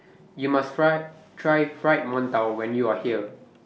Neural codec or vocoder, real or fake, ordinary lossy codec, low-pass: none; real; none; none